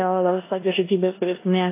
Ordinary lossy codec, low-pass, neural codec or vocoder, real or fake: AAC, 24 kbps; 3.6 kHz; codec, 16 kHz in and 24 kHz out, 0.9 kbps, LongCat-Audio-Codec, four codebook decoder; fake